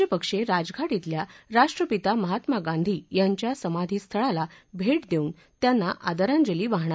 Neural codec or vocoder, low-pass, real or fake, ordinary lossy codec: none; none; real; none